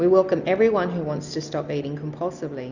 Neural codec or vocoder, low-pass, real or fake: none; 7.2 kHz; real